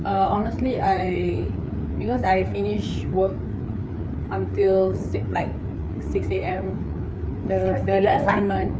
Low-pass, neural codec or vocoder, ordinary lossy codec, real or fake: none; codec, 16 kHz, 4 kbps, FreqCodec, larger model; none; fake